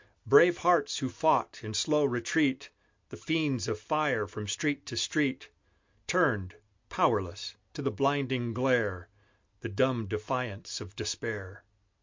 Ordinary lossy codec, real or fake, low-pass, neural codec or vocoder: MP3, 48 kbps; real; 7.2 kHz; none